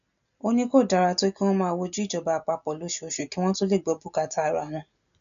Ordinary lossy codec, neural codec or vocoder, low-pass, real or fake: none; none; 7.2 kHz; real